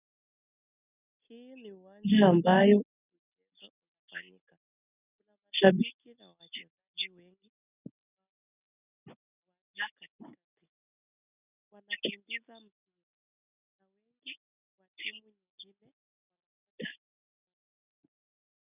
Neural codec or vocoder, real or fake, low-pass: none; real; 3.6 kHz